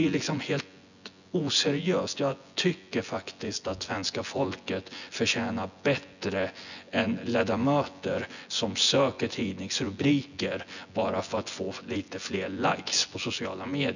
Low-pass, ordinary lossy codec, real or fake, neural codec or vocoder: 7.2 kHz; none; fake; vocoder, 24 kHz, 100 mel bands, Vocos